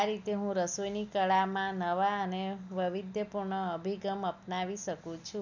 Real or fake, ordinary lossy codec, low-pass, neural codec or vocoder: real; none; 7.2 kHz; none